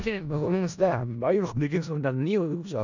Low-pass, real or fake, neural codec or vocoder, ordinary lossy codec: 7.2 kHz; fake; codec, 16 kHz in and 24 kHz out, 0.4 kbps, LongCat-Audio-Codec, four codebook decoder; none